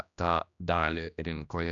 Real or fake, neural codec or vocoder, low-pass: fake; codec, 16 kHz, 1 kbps, X-Codec, HuBERT features, trained on general audio; 7.2 kHz